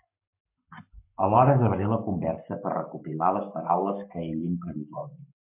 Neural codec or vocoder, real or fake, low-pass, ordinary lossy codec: codec, 44.1 kHz, 7.8 kbps, Pupu-Codec; fake; 3.6 kHz; MP3, 32 kbps